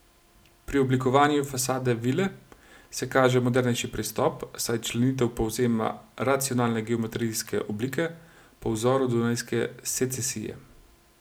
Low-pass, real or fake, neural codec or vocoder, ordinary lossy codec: none; real; none; none